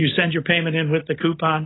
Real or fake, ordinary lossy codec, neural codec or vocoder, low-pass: real; AAC, 16 kbps; none; 7.2 kHz